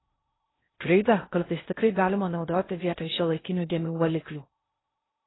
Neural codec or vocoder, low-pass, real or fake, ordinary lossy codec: codec, 16 kHz in and 24 kHz out, 0.6 kbps, FocalCodec, streaming, 2048 codes; 7.2 kHz; fake; AAC, 16 kbps